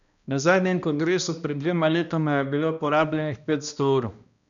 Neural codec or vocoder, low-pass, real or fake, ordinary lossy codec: codec, 16 kHz, 1 kbps, X-Codec, HuBERT features, trained on balanced general audio; 7.2 kHz; fake; none